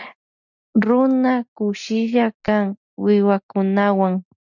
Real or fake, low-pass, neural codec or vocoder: real; 7.2 kHz; none